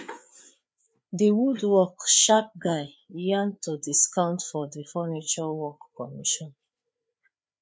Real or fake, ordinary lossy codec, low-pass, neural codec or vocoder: fake; none; none; codec, 16 kHz, 4 kbps, FreqCodec, larger model